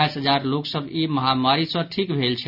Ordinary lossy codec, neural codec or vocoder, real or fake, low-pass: none; none; real; 5.4 kHz